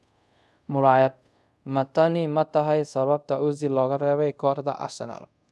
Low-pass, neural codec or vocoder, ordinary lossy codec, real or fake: none; codec, 24 kHz, 0.5 kbps, DualCodec; none; fake